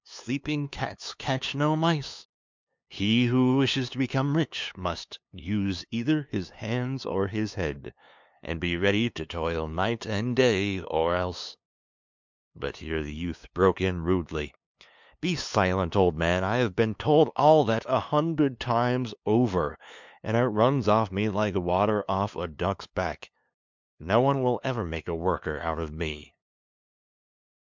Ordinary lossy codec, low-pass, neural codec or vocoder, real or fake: MP3, 64 kbps; 7.2 kHz; codec, 16 kHz, 2 kbps, FunCodec, trained on LibriTTS, 25 frames a second; fake